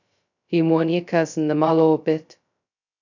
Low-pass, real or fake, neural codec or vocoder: 7.2 kHz; fake; codec, 16 kHz, 0.2 kbps, FocalCodec